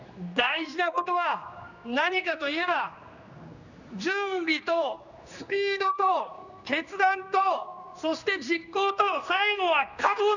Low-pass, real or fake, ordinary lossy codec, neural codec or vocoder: 7.2 kHz; fake; none; codec, 16 kHz, 2 kbps, X-Codec, HuBERT features, trained on general audio